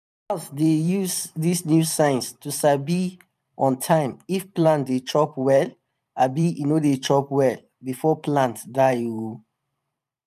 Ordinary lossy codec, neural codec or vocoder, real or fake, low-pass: none; vocoder, 44.1 kHz, 128 mel bands every 512 samples, BigVGAN v2; fake; 14.4 kHz